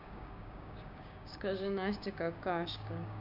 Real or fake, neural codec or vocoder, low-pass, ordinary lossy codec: fake; codec, 16 kHz, 6 kbps, DAC; 5.4 kHz; none